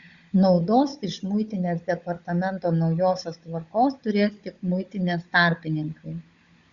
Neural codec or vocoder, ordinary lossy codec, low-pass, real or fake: codec, 16 kHz, 16 kbps, FunCodec, trained on Chinese and English, 50 frames a second; Opus, 64 kbps; 7.2 kHz; fake